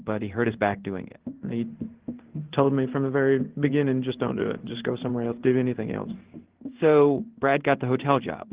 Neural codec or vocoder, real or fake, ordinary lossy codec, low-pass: codec, 16 kHz in and 24 kHz out, 1 kbps, XY-Tokenizer; fake; Opus, 16 kbps; 3.6 kHz